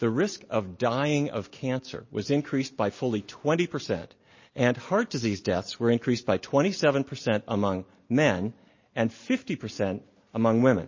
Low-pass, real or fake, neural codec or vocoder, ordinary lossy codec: 7.2 kHz; real; none; MP3, 32 kbps